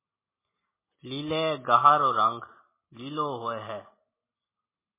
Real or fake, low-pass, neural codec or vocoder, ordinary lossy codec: real; 3.6 kHz; none; MP3, 16 kbps